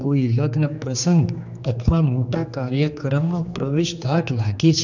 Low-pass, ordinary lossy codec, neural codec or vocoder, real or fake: 7.2 kHz; none; codec, 16 kHz, 2 kbps, X-Codec, HuBERT features, trained on general audio; fake